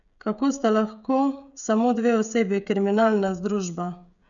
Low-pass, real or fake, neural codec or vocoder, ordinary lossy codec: 7.2 kHz; fake; codec, 16 kHz, 16 kbps, FreqCodec, smaller model; none